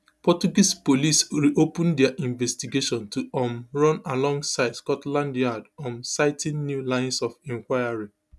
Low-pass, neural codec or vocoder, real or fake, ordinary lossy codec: none; none; real; none